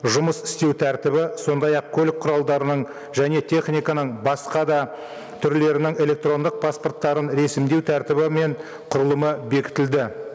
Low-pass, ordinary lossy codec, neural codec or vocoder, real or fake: none; none; none; real